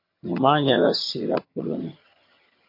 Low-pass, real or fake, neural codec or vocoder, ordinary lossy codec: 5.4 kHz; fake; vocoder, 22.05 kHz, 80 mel bands, HiFi-GAN; MP3, 32 kbps